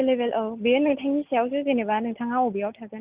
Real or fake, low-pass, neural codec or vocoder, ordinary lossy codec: real; 3.6 kHz; none; Opus, 32 kbps